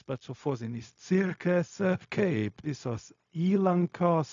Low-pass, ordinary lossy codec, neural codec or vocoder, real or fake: 7.2 kHz; AAC, 64 kbps; codec, 16 kHz, 0.4 kbps, LongCat-Audio-Codec; fake